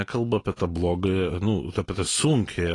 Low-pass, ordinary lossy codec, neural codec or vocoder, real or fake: 10.8 kHz; AAC, 32 kbps; none; real